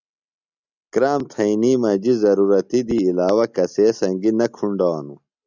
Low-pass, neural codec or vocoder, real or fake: 7.2 kHz; none; real